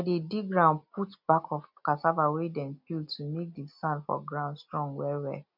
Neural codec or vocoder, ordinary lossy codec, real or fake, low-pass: none; none; real; 5.4 kHz